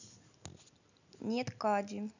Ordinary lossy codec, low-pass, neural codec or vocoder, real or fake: none; 7.2 kHz; none; real